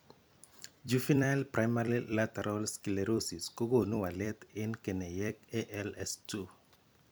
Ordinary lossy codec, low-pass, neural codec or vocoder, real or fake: none; none; vocoder, 44.1 kHz, 128 mel bands every 256 samples, BigVGAN v2; fake